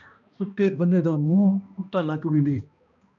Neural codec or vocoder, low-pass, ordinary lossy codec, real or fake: codec, 16 kHz, 1 kbps, X-Codec, HuBERT features, trained on balanced general audio; 7.2 kHz; MP3, 96 kbps; fake